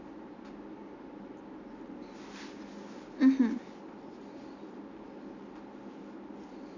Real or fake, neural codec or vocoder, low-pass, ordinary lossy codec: real; none; 7.2 kHz; none